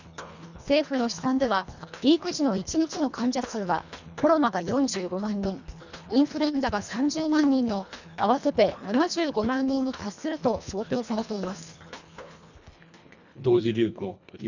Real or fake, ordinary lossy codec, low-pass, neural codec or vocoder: fake; none; 7.2 kHz; codec, 24 kHz, 1.5 kbps, HILCodec